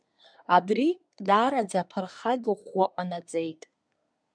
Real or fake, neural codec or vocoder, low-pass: fake; codec, 24 kHz, 1 kbps, SNAC; 9.9 kHz